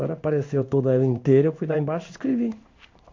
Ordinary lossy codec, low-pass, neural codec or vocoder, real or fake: MP3, 48 kbps; 7.2 kHz; codec, 16 kHz in and 24 kHz out, 1 kbps, XY-Tokenizer; fake